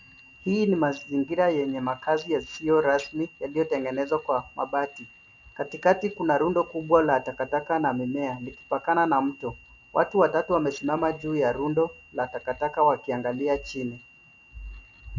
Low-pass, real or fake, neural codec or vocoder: 7.2 kHz; real; none